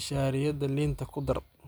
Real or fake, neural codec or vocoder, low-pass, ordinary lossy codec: real; none; none; none